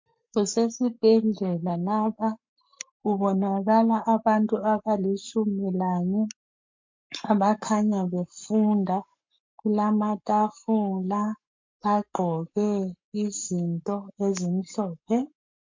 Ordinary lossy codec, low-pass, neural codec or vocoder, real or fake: MP3, 48 kbps; 7.2 kHz; codec, 44.1 kHz, 7.8 kbps, Pupu-Codec; fake